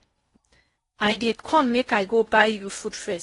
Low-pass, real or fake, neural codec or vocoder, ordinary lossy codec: 10.8 kHz; fake; codec, 16 kHz in and 24 kHz out, 0.6 kbps, FocalCodec, streaming, 4096 codes; AAC, 32 kbps